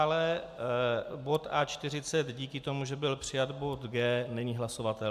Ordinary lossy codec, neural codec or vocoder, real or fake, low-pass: AAC, 96 kbps; none; real; 14.4 kHz